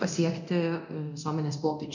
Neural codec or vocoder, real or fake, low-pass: codec, 24 kHz, 0.9 kbps, DualCodec; fake; 7.2 kHz